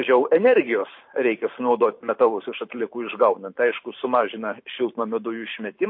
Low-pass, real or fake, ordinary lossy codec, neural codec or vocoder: 5.4 kHz; fake; MP3, 32 kbps; vocoder, 44.1 kHz, 128 mel bands every 512 samples, BigVGAN v2